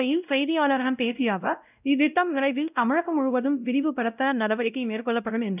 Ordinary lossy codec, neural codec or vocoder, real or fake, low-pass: none; codec, 16 kHz, 0.5 kbps, X-Codec, WavLM features, trained on Multilingual LibriSpeech; fake; 3.6 kHz